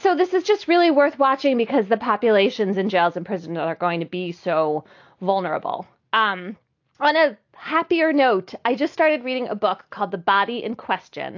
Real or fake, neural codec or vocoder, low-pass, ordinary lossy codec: real; none; 7.2 kHz; AAC, 48 kbps